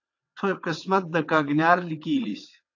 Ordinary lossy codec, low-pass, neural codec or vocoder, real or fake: AAC, 48 kbps; 7.2 kHz; vocoder, 22.05 kHz, 80 mel bands, WaveNeXt; fake